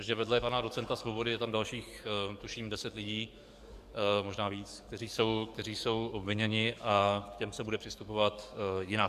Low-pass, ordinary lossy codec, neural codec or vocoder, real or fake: 14.4 kHz; Opus, 64 kbps; codec, 44.1 kHz, 7.8 kbps, DAC; fake